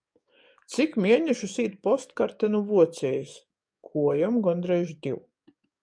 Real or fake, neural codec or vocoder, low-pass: fake; codec, 44.1 kHz, 7.8 kbps, DAC; 9.9 kHz